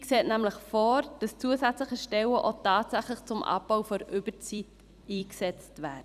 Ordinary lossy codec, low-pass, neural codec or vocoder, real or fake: none; 14.4 kHz; vocoder, 44.1 kHz, 128 mel bands every 256 samples, BigVGAN v2; fake